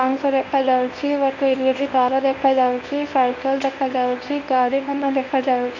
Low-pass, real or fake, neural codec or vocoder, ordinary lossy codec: 7.2 kHz; fake; codec, 24 kHz, 0.9 kbps, WavTokenizer, medium speech release version 1; none